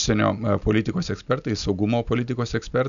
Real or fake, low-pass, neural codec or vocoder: real; 7.2 kHz; none